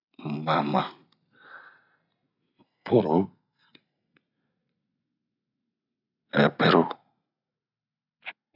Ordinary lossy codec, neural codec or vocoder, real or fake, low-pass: none; none; real; 5.4 kHz